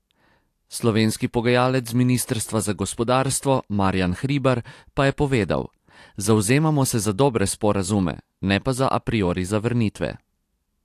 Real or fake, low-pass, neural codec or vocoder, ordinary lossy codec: real; 14.4 kHz; none; AAC, 64 kbps